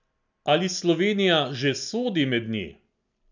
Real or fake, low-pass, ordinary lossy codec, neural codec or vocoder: real; 7.2 kHz; none; none